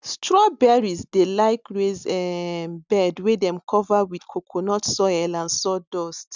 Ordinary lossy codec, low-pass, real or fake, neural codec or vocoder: none; 7.2 kHz; real; none